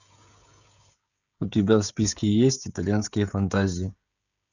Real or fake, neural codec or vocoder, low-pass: fake; codec, 16 kHz, 16 kbps, FreqCodec, smaller model; 7.2 kHz